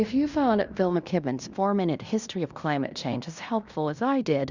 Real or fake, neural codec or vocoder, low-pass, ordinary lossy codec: fake; codec, 16 kHz in and 24 kHz out, 0.9 kbps, LongCat-Audio-Codec, fine tuned four codebook decoder; 7.2 kHz; Opus, 64 kbps